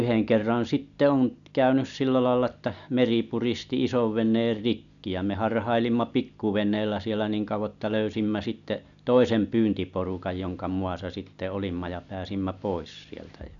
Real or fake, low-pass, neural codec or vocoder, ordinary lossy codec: real; 7.2 kHz; none; none